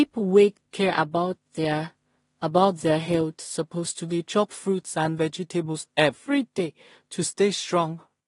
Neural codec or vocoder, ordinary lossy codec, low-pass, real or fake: codec, 16 kHz in and 24 kHz out, 0.4 kbps, LongCat-Audio-Codec, two codebook decoder; AAC, 32 kbps; 10.8 kHz; fake